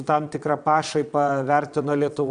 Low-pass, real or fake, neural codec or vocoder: 9.9 kHz; fake; vocoder, 22.05 kHz, 80 mel bands, Vocos